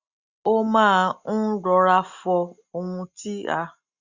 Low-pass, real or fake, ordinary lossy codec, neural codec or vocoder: 7.2 kHz; real; Opus, 64 kbps; none